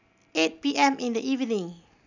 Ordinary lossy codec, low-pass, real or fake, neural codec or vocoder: none; 7.2 kHz; real; none